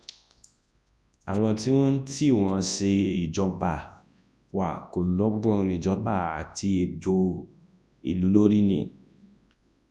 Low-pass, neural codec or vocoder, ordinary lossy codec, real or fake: none; codec, 24 kHz, 0.9 kbps, WavTokenizer, large speech release; none; fake